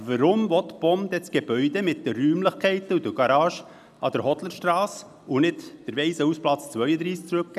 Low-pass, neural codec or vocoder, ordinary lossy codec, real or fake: 14.4 kHz; none; none; real